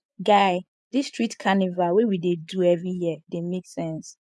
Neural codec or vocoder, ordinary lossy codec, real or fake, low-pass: vocoder, 24 kHz, 100 mel bands, Vocos; none; fake; none